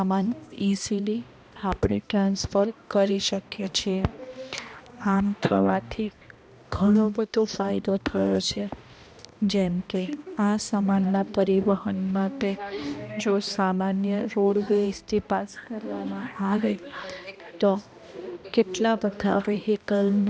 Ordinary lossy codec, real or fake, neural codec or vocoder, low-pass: none; fake; codec, 16 kHz, 1 kbps, X-Codec, HuBERT features, trained on balanced general audio; none